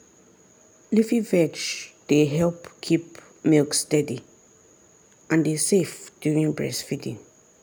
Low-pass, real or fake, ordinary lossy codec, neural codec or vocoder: none; real; none; none